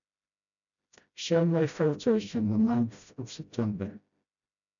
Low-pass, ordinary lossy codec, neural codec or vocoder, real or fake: 7.2 kHz; none; codec, 16 kHz, 0.5 kbps, FreqCodec, smaller model; fake